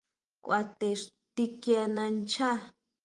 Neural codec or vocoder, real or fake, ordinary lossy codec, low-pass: vocoder, 24 kHz, 100 mel bands, Vocos; fake; Opus, 24 kbps; 10.8 kHz